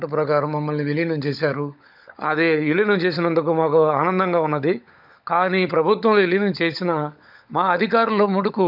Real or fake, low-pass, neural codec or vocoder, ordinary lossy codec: fake; 5.4 kHz; codec, 16 kHz, 16 kbps, FunCodec, trained on LibriTTS, 50 frames a second; none